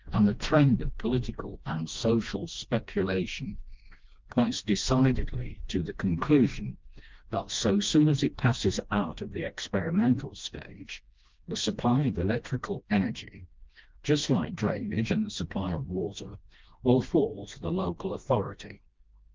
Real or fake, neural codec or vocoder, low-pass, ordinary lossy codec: fake; codec, 16 kHz, 1 kbps, FreqCodec, smaller model; 7.2 kHz; Opus, 32 kbps